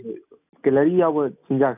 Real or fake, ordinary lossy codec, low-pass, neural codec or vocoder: real; none; 3.6 kHz; none